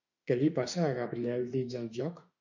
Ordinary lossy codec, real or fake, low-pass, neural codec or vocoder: MP3, 64 kbps; fake; 7.2 kHz; autoencoder, 48 kHz, 32 numbers a frame, DAC-VAE, trained on Japanese speech